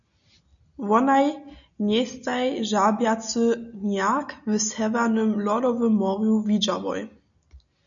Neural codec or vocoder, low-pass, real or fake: none; 7.2 kHz; real